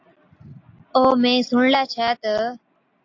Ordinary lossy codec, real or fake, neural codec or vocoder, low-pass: AAC, 48 kbps; real; none; 7.2 kHz